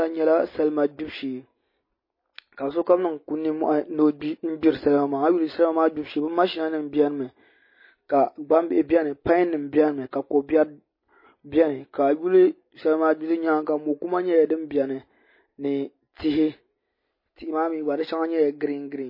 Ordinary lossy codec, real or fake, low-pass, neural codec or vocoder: MP3, 24 kbps; real; 5.4 kHz; none